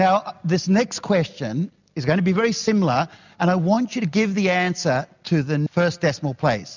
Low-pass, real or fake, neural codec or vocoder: 7.2 kHz; real; none